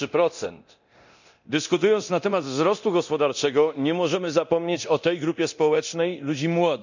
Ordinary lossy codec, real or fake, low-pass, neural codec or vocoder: none; fake; 7.2 kHz; codec, 24 kHz, 0.9 kbps, DualCodec